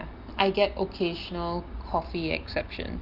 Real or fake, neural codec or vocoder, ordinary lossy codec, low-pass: real; none; Opus, 24 kbps; 5.4 kHz